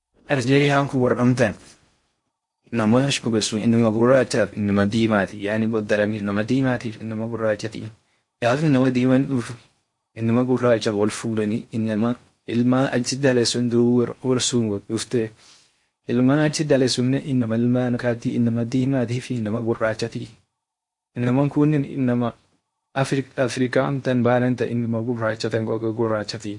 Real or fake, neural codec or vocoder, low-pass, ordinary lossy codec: fake; codec, 16 kHz in and 24 kHz out, 0.6 kbps, FocalCodec, streaming, 4096 codes; 10.8 kHz; MP3, 48 kbps